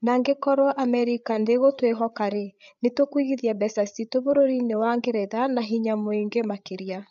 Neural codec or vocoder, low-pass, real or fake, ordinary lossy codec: codec, 16 kHz, 8 kbps, FreqCodec, larger model; 7.2 kHz; fake; none